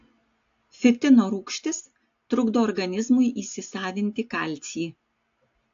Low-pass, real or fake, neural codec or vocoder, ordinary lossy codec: 7.2 kHz; real; none; AAC, 48 kbps